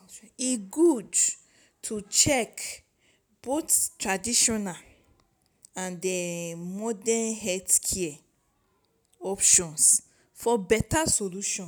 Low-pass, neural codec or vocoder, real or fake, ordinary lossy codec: none; none; real; none